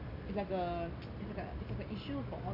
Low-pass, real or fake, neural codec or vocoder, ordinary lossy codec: 5.4 kHz; real; none; none